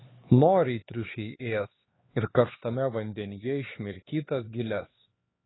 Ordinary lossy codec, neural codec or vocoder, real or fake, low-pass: AAC, 16 kbps; codec, 16 kHz, 4 kbps, X-Codec, HuBERT features, trained on LibriSpeech; fake; 7.2 kHz